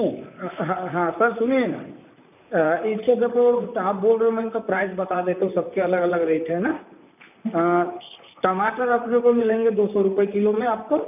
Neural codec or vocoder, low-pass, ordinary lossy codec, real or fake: vocoder, 44.1 kHz, 128 mel bands, Pupu-Vocoder; 3.6 kHz; none; fake